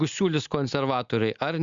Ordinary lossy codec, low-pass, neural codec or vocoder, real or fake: Opus, 64 kbps; 7.2 kHz; none; real